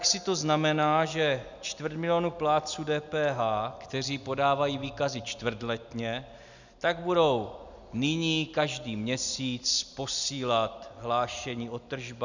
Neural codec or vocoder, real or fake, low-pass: none; real; 7.2 kHz